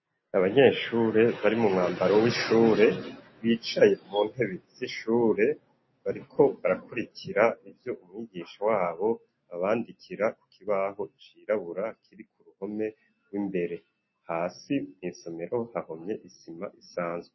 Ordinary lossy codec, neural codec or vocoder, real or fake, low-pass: MP3, 24 kbps; none; real; 7.2 kHz